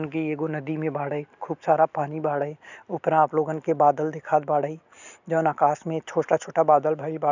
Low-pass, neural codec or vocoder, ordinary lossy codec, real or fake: 7.2 kHz; none; none; real